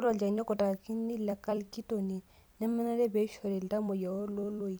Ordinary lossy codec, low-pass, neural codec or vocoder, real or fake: none; none; vocoder, 44.1 kHz, 128 mel bands every 256 samples, BigVGAN v2; fake